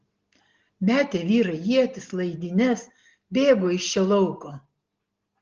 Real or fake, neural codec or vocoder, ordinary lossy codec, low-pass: real; none; Opus, 16 kbps; 7.2 kHz